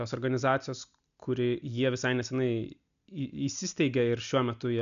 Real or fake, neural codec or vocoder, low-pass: real; none; 7.2 kHz